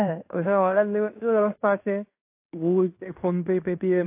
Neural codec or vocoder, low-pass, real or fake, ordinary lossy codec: codec, 16 kHz in and 24 kHz out, 0.9 kbps, LongCat-Audio-Codec, fine tuned four codebook decoder; 3.6 kHz; fake; AAC, 32 kbps